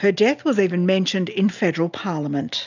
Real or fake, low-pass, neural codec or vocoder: real; 7.2 kHz; none